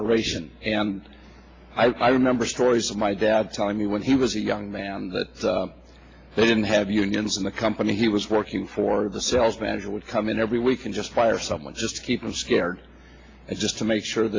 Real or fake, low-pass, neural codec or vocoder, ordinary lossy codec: real; 7.2 kHz; none; AAC, 32 kbps